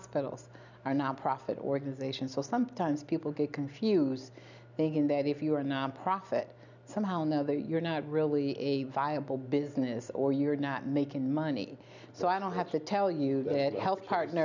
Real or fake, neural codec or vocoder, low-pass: real; none; 7.2 kHz